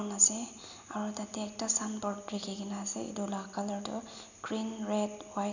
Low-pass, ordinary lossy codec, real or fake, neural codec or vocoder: 7.2 kHz; none; real; none